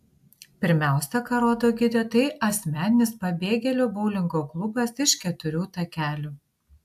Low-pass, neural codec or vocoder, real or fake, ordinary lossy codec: 14.4 kHz; none; real; AAC, 96 kbps